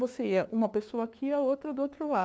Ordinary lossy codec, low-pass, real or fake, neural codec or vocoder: none; none; fake; codec, 16 kHz, 2 kbps, FunCodec, trained on LibriTTS, 25 frames a second